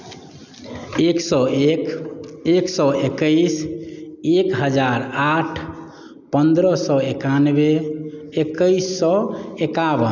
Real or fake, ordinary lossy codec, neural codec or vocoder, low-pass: real; none; none; 7.2 kHz